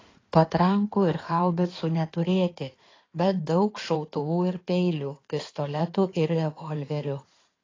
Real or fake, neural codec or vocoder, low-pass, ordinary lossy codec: fake; codec, 16 kHz in and 24 kHz out, 2.2 kbps, FireRedTTS-2 codec; 7.2 kHz; AAC, 32 kbps